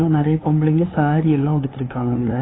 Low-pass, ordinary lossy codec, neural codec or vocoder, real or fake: 7.2 kHz; AAC, 16 kbps; codec, 16 kHz, 2 kbps, FunCodec, trained on LibriTTS, 25 frames a second; fake